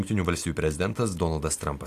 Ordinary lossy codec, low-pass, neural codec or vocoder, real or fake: AAC, 64 kbps; 14.4 kHz; none; real